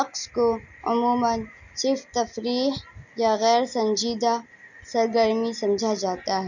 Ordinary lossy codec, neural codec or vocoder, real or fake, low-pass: none; none; real; 7.2 kHz